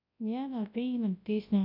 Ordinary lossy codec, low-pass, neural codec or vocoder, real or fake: none; 5.4 kHz; codec, 24 kHz, 0.9 kbps, WavTokenizer, large speech release; fake